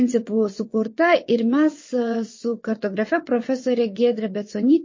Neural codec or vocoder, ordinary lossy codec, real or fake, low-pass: vocoder, 22.05 kHz, 80 mel bands, WaveNeXt; MP3, 32 kbps; fake; 7.2 kHz